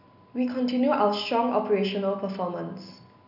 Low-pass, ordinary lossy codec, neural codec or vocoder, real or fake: 5.4 kHz; none; none; real